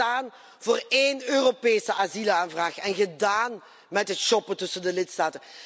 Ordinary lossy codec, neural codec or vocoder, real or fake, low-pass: none; none; real; none